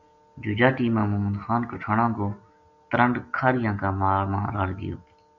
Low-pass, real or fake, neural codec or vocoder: 7.2 kHz; real; none